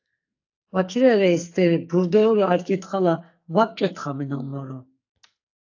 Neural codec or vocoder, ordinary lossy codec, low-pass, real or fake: codec, 44.1 kHz, 2.6 kbps, SNAC; AAC, 48 kbps; 7.2 kHz; fake